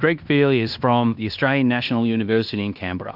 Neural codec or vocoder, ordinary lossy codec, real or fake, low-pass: codec, 16 kHz in and 24 kHz out, 0.9 kbps, LongCat-Audio-Codec, fine tuned four codebook decoder; AAC, 48 kbps; fake; 5.4 kHz